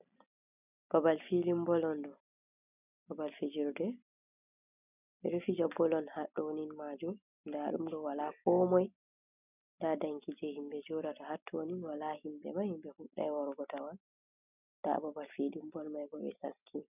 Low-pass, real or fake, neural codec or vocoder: 3.6 kHz; real; none